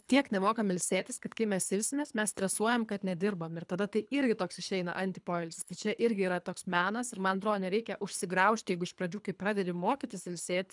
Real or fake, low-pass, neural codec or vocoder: fake; 10.8 kHz; codec, 24 kHz, 3 kbps, HILCodec